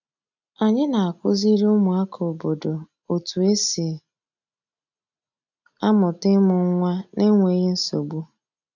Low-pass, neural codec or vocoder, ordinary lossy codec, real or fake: 7.2 kHz; none; none; real